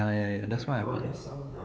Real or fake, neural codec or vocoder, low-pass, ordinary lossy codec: fake; codec, 16 kHz, 4 kbps, X-Codec, WavLM features, trained on Multilingual LibriSpeech; none; none